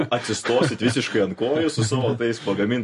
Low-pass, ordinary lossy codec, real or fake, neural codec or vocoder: 14.4 kHz; MP3, 48 kbps; real; none